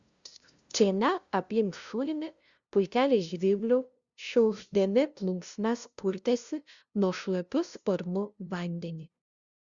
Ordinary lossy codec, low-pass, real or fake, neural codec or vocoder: Opus, 64 kbps; 7.2 kHz; fake; codec, 16 kHz, 0.5 kbps, FunCodec, trained on LibriTTS, 25 frames a second